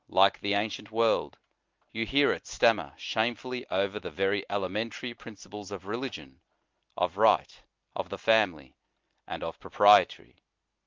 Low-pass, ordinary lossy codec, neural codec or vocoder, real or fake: 7.2 kHz; Opus, 32 kbps; none; real